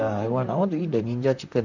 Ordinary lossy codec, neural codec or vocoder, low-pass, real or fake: none; vocoder, 44.1 kHz, 128 mel bands, Pupu-Vocoder; 7.2 kHz; fake